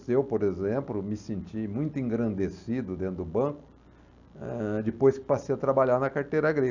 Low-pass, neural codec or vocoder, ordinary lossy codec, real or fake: 7.2 kHz; none; none; real